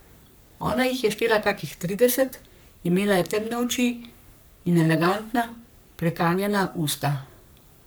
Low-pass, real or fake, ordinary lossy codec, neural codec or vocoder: none; fake; none; codec, 44.1 kHz, 3.4 kbps, Pupu-Codec